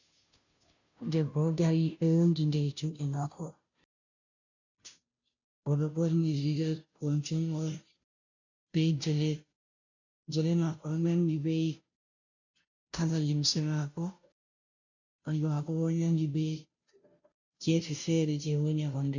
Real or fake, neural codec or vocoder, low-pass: fake; codec, 16 kHz, 0.5 kbps, FunCodec, trained on Chinese and English, 25 frames a second; 7.2 kHz